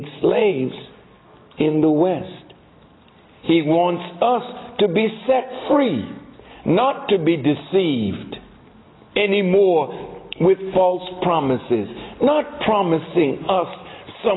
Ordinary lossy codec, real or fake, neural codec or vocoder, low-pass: AAC, 16 kbps; real; none; 7.2 kHz